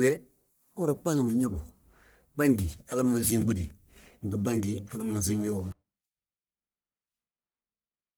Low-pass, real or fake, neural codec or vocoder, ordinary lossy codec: none; fake; codec, 44.1 kHz, 1.7 kbps, Pupu-Codec; none